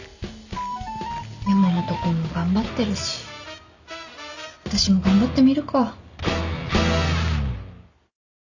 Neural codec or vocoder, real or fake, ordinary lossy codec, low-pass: none; real; none; 7.2 kHz